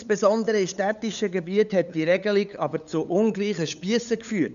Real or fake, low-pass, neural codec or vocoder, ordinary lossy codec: fake; 7.2 kHz; codec, 16 kHz, 8 kbps, FunCodec, trained on LibriTTS, 25 frames a second; none